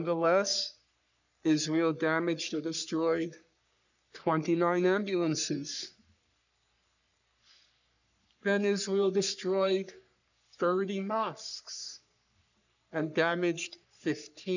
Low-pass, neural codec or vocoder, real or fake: 7.2 kHz; codec, 44.1 kHz, 3.4 kbps, Pupu-Codec; fake